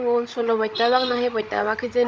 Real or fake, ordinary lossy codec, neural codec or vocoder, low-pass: fake; none; codec, 16 kHz, 16 kbps, FreqCodec, larger model; none